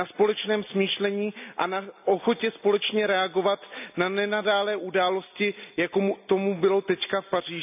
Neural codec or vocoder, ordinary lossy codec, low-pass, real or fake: none; none; 3.6 kHz; real